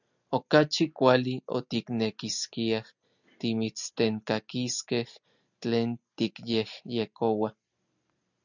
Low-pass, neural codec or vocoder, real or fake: 7.2 kHz; none; real